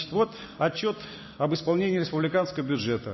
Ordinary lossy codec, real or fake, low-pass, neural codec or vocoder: MP3, 24 kbps; real; 7.2 kHz; none